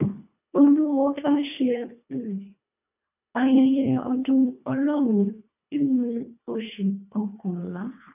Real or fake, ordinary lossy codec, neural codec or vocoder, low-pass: fake; none; codec, 24 kHz, 1.5 kbps, HILCodec; 3.6 kHz